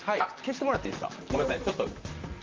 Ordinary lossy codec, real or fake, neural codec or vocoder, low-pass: Opus, 24 kbps; fake; vocoder, 44.1 kHz, 128 mel bands, Pupu-Vocoder; 7.2 kHz